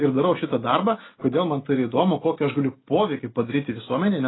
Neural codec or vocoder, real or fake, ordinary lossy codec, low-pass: none; real; AAC, 16 kbps; 7.2 kHz